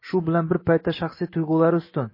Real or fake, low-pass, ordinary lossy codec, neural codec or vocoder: real; 5.4 kHz; MP3, 24 kbps; none